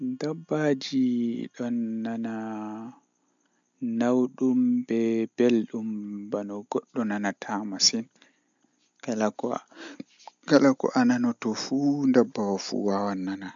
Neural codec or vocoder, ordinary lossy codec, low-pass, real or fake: none; MP3, 64 kbps; 7.2 kHz; real